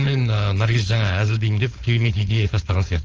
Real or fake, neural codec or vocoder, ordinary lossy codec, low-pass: fake; codec, 16 kHz, 4.8 kbps, FACodec; Opus, 24 kbps; 7.2 kHz